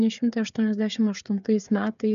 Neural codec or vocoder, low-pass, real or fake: codec, 16 kHz, 8 kbps, FreqCodec, smaller model; 7.2 kHz; fake